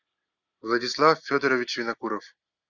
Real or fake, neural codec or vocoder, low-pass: real; none; 7.2 kHz